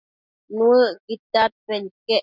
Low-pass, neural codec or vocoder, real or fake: 5.4 kHz; none; real